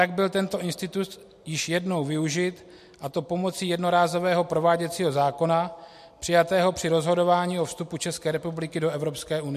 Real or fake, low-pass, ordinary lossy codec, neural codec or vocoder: real; 14.4 kHz; MP3, 64 kbps; none